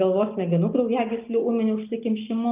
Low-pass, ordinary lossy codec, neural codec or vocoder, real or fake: 3.6 kHz; Opus, 24 kbps; none; real